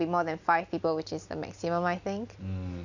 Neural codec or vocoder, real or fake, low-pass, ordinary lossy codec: none; real; 7.2 kHz; none